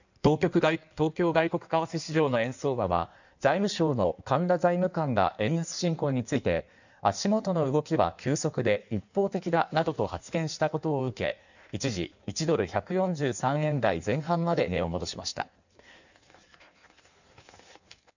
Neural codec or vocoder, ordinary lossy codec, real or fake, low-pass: codec, 16 kHz in and 24 kHz out, 1.1 kbps, FireRedTTS-2 codec; none; fake; 7.2 kHz